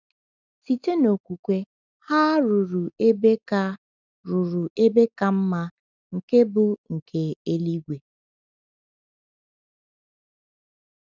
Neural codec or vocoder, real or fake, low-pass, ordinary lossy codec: none; real; 7.2 kHz; none